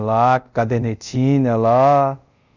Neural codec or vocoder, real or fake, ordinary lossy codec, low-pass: codec, 24 kHz, 0.5 kbps, DualCodec; fake; none; 7.2 kHz